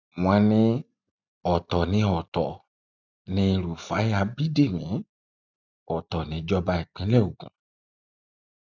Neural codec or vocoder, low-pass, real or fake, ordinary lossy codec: none; 7.2 kHz; real; none